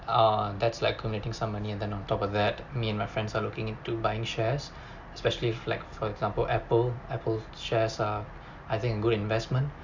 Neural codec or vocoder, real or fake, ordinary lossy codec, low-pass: none; real; Opus, 64 kbps; 7.2 kHz